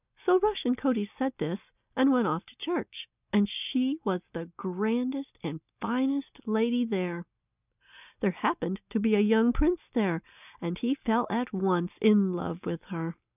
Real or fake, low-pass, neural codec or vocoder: real; 3.6 kHz; none